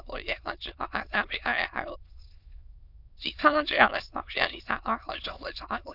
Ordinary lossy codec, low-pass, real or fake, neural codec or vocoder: none; 5.4 kHz; fake; autoencoder, 22.05 kHz, a latent of 192 numbers a frame, VITS, trained on many speakers